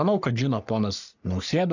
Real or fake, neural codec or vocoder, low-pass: fake; codec, 44.1 kHz, 3.4 kbps, Pupu-Codec; 7.2 kHz